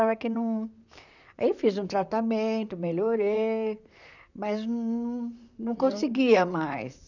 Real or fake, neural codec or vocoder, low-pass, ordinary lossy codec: fake; vocoder, 44.1 kHz, 128 mel bands, Pupu-Vocoder; 7.2 kHz; none